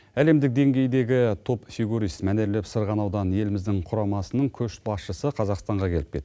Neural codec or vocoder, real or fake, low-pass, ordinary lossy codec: none; real; none; none